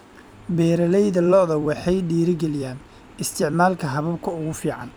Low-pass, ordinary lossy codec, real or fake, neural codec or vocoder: none; none; fake; vocoder, 44.1 kHz, 128 mel bands every 256 samples, BigVGAN v2